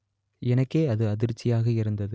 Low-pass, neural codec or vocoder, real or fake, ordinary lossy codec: none; none; real; none